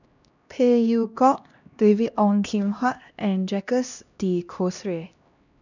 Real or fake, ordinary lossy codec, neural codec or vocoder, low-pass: fake; none; codec, 16 kHz, 1 kbps, X-Codec, HuBERT features, trained on LibriSpeech; 7.2 kHz